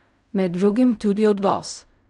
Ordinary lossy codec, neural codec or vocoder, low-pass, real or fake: none; codec, 16 kHz in and 24 kHz out, 0.4 kbps, LongCat-Audio-Codec, fine tuned four codebook decoder; 10.8 kHz; fake